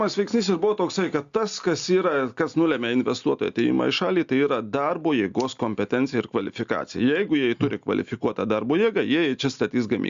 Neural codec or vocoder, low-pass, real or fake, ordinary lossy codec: none; 7.2 kHz; real; Opus, 64 kbps